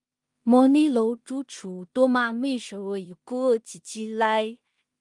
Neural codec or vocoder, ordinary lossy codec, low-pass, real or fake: codec, 16 kHz in and 24 kHz out, 0.4 kbps, LongCat-Audio-Codec, two codebook decoder; Opus, 32 kbps; 10.8 kHz; fake